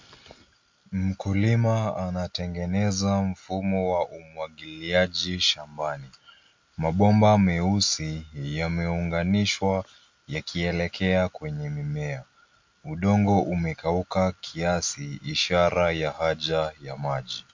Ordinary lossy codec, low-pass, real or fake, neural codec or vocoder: MP3, 48 kbps; 7.2 kHz; real; none